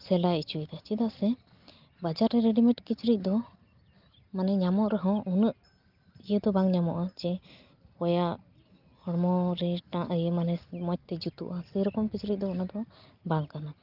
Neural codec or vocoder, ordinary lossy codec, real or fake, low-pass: none; Opus, 32 kbps; real; 5.4 kHz